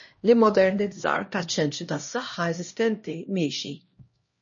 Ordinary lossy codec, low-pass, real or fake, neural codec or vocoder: MP3, 32 kbps; 7.2 kHz; fake; codec, 16 kHz, 1 kbps, X-Codec, HuBERT features, trained on LibriSpeech